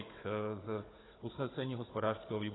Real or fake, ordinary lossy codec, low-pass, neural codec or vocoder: fake; AAC, 16 kbps; 7.2 kHz; codec, 16 kHz, 16 kbps, FunCodec, trained on Chinese and English, 50 frames a second